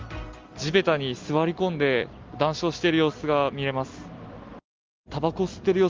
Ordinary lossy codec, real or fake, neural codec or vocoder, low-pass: Opus, 32 kbps; real; none; 7.2 kHz